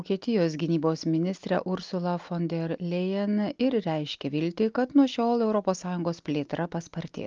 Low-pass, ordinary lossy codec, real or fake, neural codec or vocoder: 7.2 kHz; Opus, 24 kbps; real; none